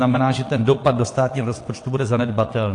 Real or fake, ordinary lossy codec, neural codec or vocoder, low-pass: fake; AAC, 48 kbps; vocoder, 22.05 kHz, 80 mel bands, WaveNeXt; 9.9 kHz